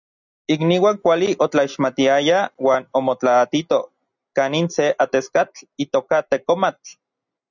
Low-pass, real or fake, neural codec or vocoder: 7.2 kHz; real; none